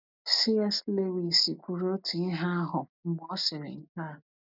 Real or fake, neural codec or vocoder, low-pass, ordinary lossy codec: real; none; 5.4 kHz; none